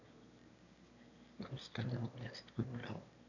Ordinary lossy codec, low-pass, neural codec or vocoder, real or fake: none; 7.2 kHz; autoencoder, 22.05 kHz, a latent of 192 numbers a frame, VITS, trained on one speaker; fake